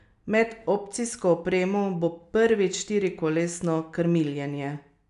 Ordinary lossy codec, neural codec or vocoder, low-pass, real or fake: none; none; 10.8 kHz; real